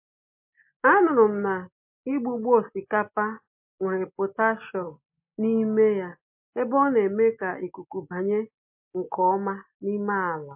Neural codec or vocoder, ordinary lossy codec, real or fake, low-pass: none; MP3, 32 kbps; real; 3.6 kHz